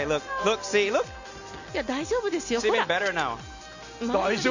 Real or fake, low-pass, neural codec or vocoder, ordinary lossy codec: real; 7.2 kHz; none; none